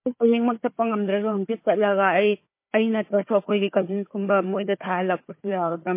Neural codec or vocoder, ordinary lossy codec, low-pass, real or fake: codec, 16 kHz, 4 kbps, FunCodec, trained on Chinese and English, 50 frames a second; MP3, 24 kbps; 3.6 kHz; fake